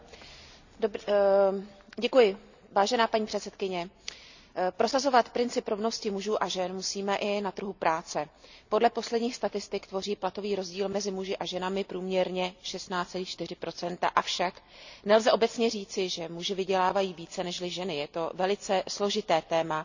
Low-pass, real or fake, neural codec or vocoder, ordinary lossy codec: 7.2 kHz; real; none; none